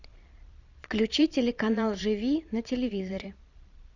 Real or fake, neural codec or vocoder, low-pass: fake; vocoder, 22.05 kHz, 80 mel bands, Vocos; 7.2 kHz